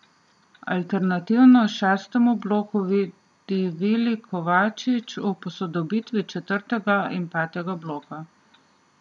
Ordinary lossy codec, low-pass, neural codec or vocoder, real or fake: none; 10.8 kHz; none; real